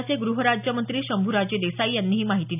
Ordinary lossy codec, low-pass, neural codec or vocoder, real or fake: none; 3.6 kHz; none; real